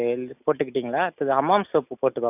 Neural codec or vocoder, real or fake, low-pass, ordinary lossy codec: none; real; 3.6 kHz; none